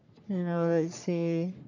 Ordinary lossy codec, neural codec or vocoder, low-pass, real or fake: AAC, 48 kbps; codec, 44.1 kHz, 3.4 kbps, Pupu-Codec; 7.2 kHz; fake